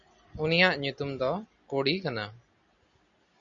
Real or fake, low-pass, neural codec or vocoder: real; 7.2 kHz; none